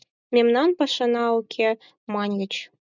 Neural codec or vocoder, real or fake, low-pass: none; real; 7.2 kHz